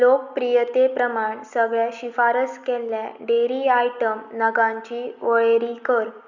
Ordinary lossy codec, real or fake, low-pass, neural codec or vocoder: none; real; 7.2 kHz; none